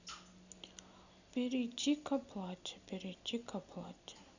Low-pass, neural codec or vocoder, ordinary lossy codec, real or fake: 7.2 kHz; none; none; real